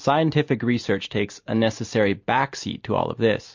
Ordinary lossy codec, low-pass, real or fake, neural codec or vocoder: MP3, 48 kbps; 7.2 kHz; real; none